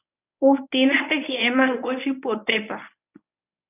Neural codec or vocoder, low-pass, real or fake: codec, 24 kHz, 0.9 kbps, WavTokenizer, medium speech release version 1; 3.6 kHz; fake